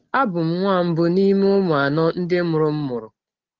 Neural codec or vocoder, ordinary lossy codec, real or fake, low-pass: none; Opus, 16 kbps; real; 7.2 kHz